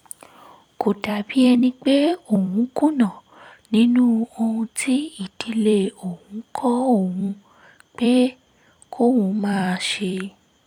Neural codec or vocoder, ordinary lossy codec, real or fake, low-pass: vocoder, 44.1 kHz, 128 mel bands every 256 samples, BigVGAN v2; none; fake; 19.8 kHz